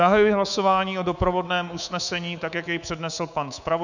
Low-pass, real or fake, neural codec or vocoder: 7.2 kHz; fake; codec, 24 kHz, 3.1 kbps, DualCodec